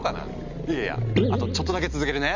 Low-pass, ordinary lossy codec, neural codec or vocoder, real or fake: 7.2 kHz; MP3, 64 kbps; none; real